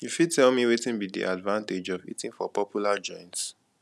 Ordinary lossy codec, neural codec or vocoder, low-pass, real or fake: none; none; none; real